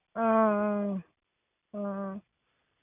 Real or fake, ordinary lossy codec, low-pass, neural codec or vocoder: real; none; 3.6 kHz; none